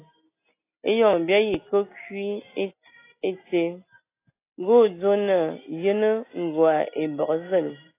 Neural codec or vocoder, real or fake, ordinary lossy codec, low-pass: none; real; AAC, 24 kbps; 3.6 kHz